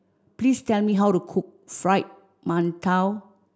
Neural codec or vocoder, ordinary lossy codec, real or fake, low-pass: none; none; real; none